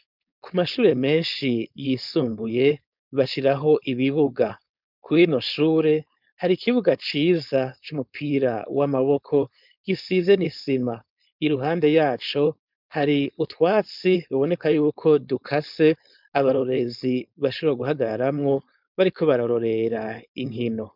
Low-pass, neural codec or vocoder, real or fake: 5.4 kHz; codec, 16 kHz, 4.8 kbps, FACodec; fake